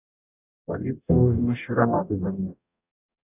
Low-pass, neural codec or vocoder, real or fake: 3.6 kHz; codec, 44.1 kHz, 0.9 kbps, DAC; fake